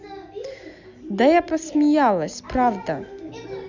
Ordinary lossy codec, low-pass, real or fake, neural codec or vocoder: none; 7.2 kHz; real; none